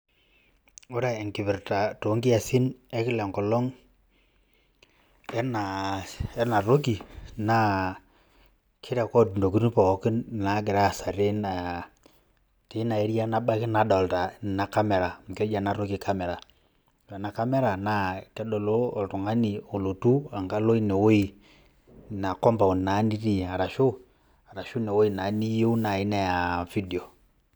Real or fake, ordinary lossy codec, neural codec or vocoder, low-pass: real; none; none; none